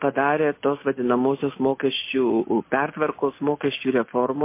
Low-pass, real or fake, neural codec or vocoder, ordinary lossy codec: 3.6 kHz; real; none; MP3, 24 kbps